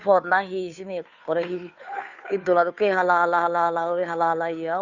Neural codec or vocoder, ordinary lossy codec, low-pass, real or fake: codec, 16 kHz, 2 kbps, FunCodec, trained on Chinese and English, 25 frames a second; none; 7.2 kHz; fake